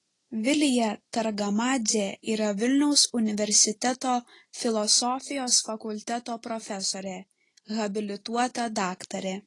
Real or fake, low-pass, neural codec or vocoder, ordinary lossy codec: real; 10.8 kHz; none; AAC, 32 kbps